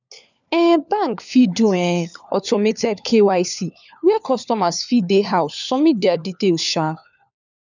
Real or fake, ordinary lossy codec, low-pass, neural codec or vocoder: fake; none; 7.2 kHz; codec, 16 kHz, 4 kbps, FunCodec, trained on LibriTTS, 50 frames a second